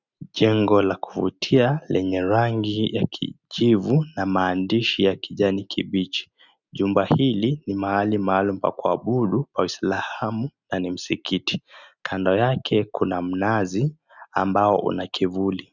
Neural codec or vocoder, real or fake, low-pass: none; real; 7.2 kHz